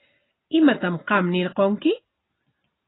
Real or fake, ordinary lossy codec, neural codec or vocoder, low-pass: real; AAC, 16 kbps; none; 7.2 kHz